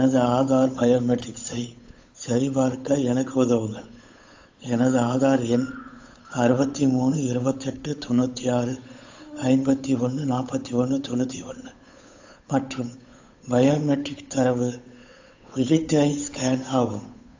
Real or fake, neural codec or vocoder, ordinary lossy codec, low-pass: fake; codec, 16 kHz, 8 kbps, FunCodec, trained on Chinese and English, 25 frames a second; AAC, 48 kbps; 7.2 kHz